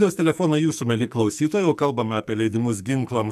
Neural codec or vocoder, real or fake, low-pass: codec, 44.1 kHz, 2.6 kbps, SNAC; fake; 14.4 kHz